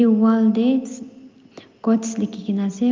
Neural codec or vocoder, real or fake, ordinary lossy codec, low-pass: none; real; Opus, 24 kbps; 7.2 kHz